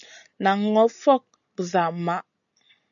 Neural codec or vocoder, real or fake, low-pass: none; real; 7.2 kHz